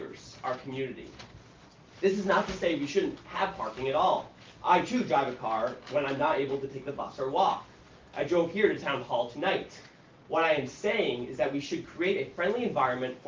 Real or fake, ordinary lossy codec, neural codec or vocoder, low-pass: real; Opus, 32 kbps; none; 7.2 kHz